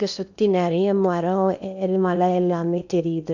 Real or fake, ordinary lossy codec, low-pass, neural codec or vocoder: fake; none; 7.2 kHz; codec, 16 kHz in and 24 kHz out, 0.8 kbps, FocalCodec, streaming, 65536 codes